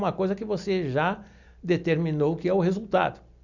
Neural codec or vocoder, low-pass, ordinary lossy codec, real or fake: none; 7.2 kHz; none; real